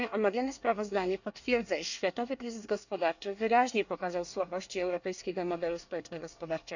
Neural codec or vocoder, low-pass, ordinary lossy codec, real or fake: codec, 24 kHz, 1 kbps, SNAC; 7.2 kHz; none; fake